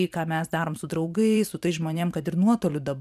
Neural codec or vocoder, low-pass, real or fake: vocoder, 44.1 kHz, 128 mel bands every 512 samples, BigVGAN v2; 14.4 kHz; fake